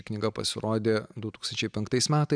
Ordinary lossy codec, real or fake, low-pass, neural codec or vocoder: MP3, 96 kbps; real; 9.9 kHz; none